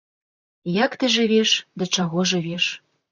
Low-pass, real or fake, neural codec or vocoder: 7.2 kHz; fake; vocoder, 44.1 kHz, 128 mel bands, Pupu-Vocoder